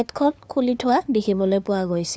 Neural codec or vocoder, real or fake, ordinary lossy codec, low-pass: codec, 16 kHz, 2 kbps, FunCodec, trained on LibriTTS, 25 frames a second; fake; none; none